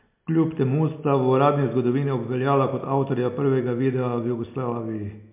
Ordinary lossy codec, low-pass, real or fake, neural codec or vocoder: MP3, 32 kbps; 3.6 kHz; real; none